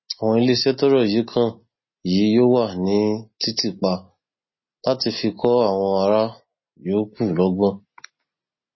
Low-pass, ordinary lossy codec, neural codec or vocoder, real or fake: 7.2 kHz; MP3, 24 kbps; none; real